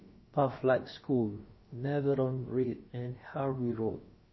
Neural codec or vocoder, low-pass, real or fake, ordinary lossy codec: codec, 16 kHz, about 1 kbps, DyCAST, with the encoder's durations; 7.2 kHz; fake; MP3, 24 kbps